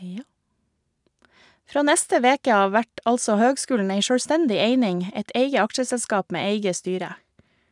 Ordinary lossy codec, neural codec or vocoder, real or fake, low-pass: none; none; real; 10.8 kHz